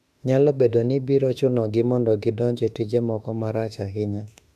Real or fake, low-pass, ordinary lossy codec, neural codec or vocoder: fake; 14.4 kHz; none; autoencoder, 48 kHz, 32 numbers a frame, DAC-VAE, trained on Japanese speech